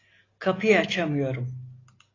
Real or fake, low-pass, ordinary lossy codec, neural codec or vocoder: real; 7.2 kHz; AAC, 32 kbps; none